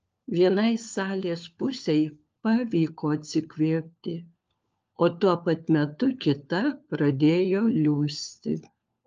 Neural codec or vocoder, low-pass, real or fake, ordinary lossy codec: codec, 16 kHz, 4 kbps, FunCodec, trained on LibriTTS, 50 frames a second; 7.2 kHz; fake; Opus, 24 kbps